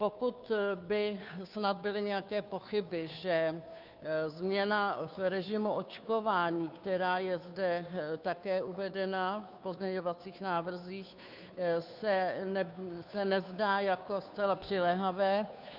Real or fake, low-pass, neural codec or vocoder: fake; 5.4 kHz; codec, 16 kHz, 2 kbps, FunCodec, trained on Chinese and English, 25 frames a second